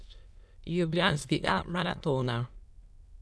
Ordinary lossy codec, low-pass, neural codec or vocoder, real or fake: none; none; autoencoder, 22.05 kHz, a latent of 192 numbers a frame, VITS, trained on many speakers; fake